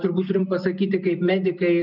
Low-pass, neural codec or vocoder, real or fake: 5.4 kHz; none; real